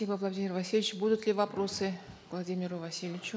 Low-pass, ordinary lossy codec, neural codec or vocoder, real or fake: none; none; none; real